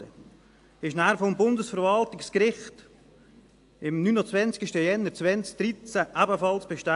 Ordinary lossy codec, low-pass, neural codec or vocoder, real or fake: AAC, 64 kbps; 10.8 kHz; none; real